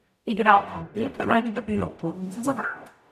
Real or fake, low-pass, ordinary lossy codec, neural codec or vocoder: fake; 14.4 kHz; none; codec, 44.1 kHz, 0.9 kbps, DAC